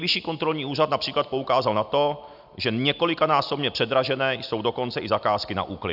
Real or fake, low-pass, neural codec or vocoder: real; 5.4 kHz; none